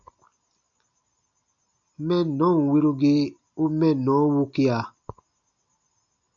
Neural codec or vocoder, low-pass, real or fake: none; 7.2 kHz; real